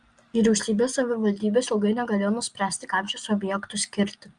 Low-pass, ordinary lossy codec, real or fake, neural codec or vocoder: 9.9 kHz; Opus, 32 kbps; real; none